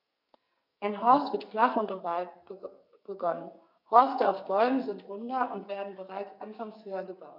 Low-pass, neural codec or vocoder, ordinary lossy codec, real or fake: 5.4 kHz; codec, 44.1 kHz, 2.6 kbps, SNAC; none; fake